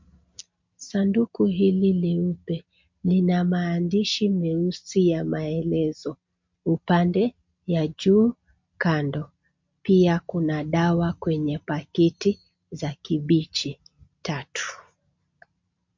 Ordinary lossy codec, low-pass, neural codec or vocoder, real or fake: MP3, 48 kbps; 7.2 kHz; none; real